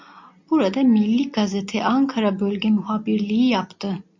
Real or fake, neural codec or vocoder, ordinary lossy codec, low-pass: real; none; MP3, 48 kbps; 7.2 kHz